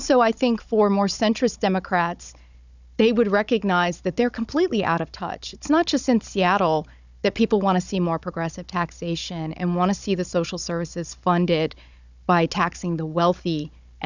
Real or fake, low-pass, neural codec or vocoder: fake; 7.2 kHz; codec, 16 kHz, 16 kbps, FunCodec, trained on Chinese and English, 50 frames a second